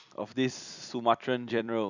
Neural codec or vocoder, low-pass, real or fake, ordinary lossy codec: vocoder, 44.1 kHz, 128 mel bands every 256 samples, BigVGAN v2; 7.2 kHz; fake; none